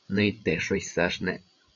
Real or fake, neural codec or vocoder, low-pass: fake; codec, 16 kHz, 8 kbps, FreqCodec, larger model; 7.2 kHz